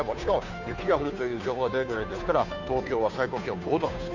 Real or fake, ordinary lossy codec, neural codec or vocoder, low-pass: fake; none; codec, 16 kHz, 2 kbps, FunCodec, trained on Chinese and English, 25 frames a second; 7.2 kHz